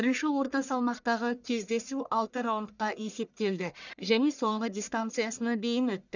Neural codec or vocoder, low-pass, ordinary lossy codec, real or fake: codec, 44.1 kHz, 1.7 kbps, Pupu-Codec; 7.2 kHz; none; fake